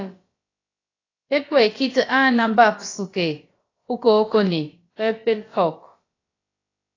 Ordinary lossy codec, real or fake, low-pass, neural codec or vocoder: AAC, 32 kbps; fake; 7.2 kHz; codec, 16 kHz, about 1 kbps, DyCAST, with the encoder's durations